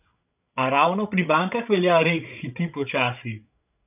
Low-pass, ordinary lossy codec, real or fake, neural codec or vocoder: 3.6 kHz; none; fake; codec, 16 kHz, 8 kbps, FreqCodec, larger model